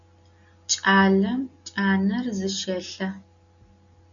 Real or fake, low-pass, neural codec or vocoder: real; 7.2 kHz; none